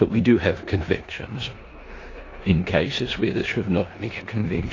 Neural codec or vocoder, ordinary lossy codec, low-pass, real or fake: codec, 16 kHz in and 24 kHz out, 0.9 kbps, LongCat-Audio-Codec, four codebook decoder; AAC, 32 kbps; 7.2 kHz; fake